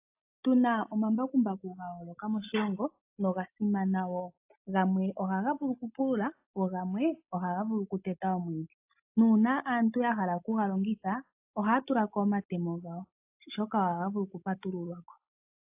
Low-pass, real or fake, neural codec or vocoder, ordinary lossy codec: 3.6 kHz; real; none; AAC, 32 kbps